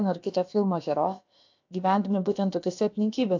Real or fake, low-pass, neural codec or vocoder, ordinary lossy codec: fake; 7.2 kHz; codec, 16 kHz, about 1 kbps, DyCAST, with the encoder's durations; AAC, 48 kbps